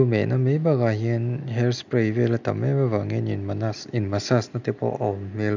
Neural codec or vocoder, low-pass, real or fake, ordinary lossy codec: none; 7.2 kHz; real; none